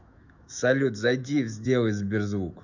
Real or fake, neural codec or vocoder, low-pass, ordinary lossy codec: fake; autoencoder, 48 kHz, 128 numbers a frame, DAC-VAE, trained on Japanese speech; 7.2 kHz; none